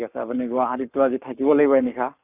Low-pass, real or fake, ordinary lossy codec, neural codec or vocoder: 3.6 kHz; fake; none; codec, 44.1 kHz, 7.8 kbps, Pupu-Codec